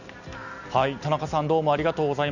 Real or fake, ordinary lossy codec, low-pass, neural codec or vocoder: real; none; 7.2 kHz; none